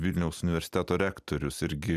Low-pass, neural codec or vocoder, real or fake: 14.4 kHz; none; real